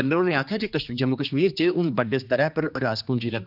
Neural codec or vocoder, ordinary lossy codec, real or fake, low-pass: codec, 16 kHz, 2 kbps, X-Codec, HuBERT features, trained on general audio; none; fake; 5.4 kHz